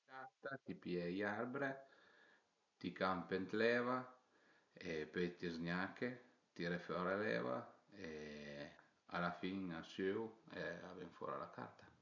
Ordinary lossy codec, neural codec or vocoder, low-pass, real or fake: none; none; 7.2 kHz; real